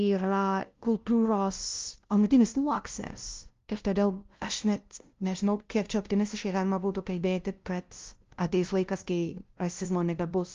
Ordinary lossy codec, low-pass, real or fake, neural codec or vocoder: Opus, 32 kbps; 7.2 kHz; fake; codec, 16 kHz, 0.5 kbps, FunCodec, trained on LibriTTS, 25 frames a second